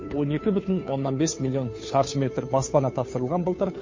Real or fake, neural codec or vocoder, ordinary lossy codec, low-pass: fake; codec, 16 kHz, 2 kbps, FunCodec, trained on Chinese and English, 25 frames a second; MP3, 32 kbps; 7.2 kHz